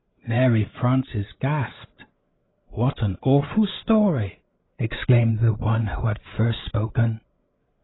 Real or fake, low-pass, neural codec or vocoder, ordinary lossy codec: fake; 7.2 kHz; codec, 16 kHz, 8 kbps, FreqCodec, larger model; AAC, 16 kbps